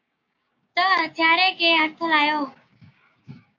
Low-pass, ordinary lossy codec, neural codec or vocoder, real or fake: 7.2 kHz; AAC, 48 kbps; codec, 16 kHz, 6 kbps, DAC; fake